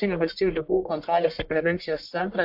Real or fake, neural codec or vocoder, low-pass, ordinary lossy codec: fake; codec, 44.1 kHz, 1.7 kbps, Pupu-Codec; 5.4 kHz; AAC, 48 kbps